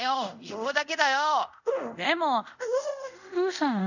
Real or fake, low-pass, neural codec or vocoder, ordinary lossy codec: fake; 7.2 kHz; codec, 24 kHz, 0.5 kbps, DualCodec; none